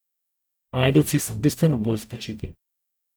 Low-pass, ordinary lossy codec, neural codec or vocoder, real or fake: none; none; codec, 44.1 kHz, 0.9 kbps, DAC; fake